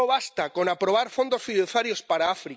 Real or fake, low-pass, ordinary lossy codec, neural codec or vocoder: real; none; none; none